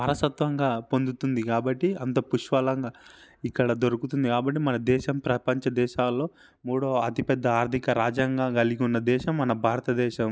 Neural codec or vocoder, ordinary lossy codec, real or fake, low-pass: none; none; real; none